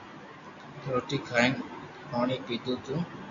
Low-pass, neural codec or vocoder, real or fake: 7.2 kHz; none; real